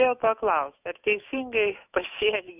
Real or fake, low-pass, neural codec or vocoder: fake; 3.6 kHz; autoencoder, 48 kHz, 128 numbers a frame, DAC-VAE, trained on Japanese speech